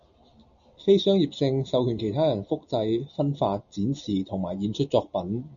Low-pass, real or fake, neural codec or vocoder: 7.2 kHz; real; none